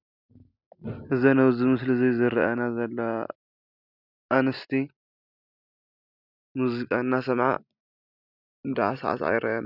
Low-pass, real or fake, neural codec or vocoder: 5.4 kHz; real; none